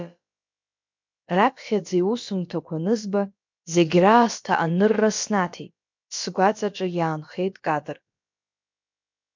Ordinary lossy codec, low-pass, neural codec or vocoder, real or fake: MP3, 64 kbps; 7.2 kHz; codec, 16 kHz, about 1 kbps, DyCAST, with the encoder's durations; fake